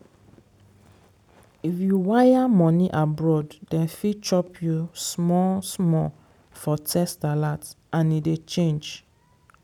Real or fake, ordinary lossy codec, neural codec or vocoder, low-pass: real; none; none; 19.8 kHz